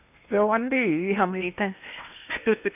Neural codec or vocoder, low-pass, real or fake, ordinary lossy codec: codec, 16 kHz in and 24 kHz out, 0.8 kbps, FocalCodec, streaming, 65536 codes; 3.6 kHz; fake; none